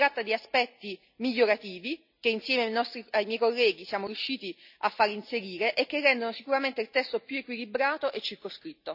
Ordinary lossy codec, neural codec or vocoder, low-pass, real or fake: none; none; 5.4 kHz; real